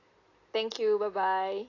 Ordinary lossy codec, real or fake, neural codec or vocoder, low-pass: AAC, 32 kbps; real; none; 7.2 kHz